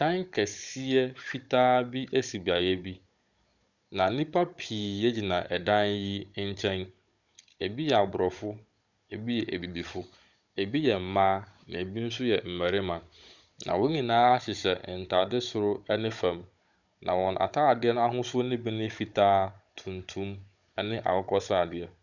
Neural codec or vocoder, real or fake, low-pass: codec, 16 kHz, 16 kbps, FunCodec, trained on Chinese and English, 50 frames a second; fake; 7.2 kHz